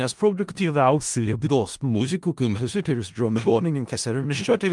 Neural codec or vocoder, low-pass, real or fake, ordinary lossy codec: codec, 16 kHz in and 24 kHz out, 0.4 kbps, LongCat-Audio-Codec, four codebook decoder; 10.8 kHz; fake; Opus, 32 kbps